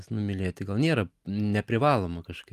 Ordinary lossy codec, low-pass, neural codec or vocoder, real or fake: Opus, 32 kbps; 14.4 kHz; none; real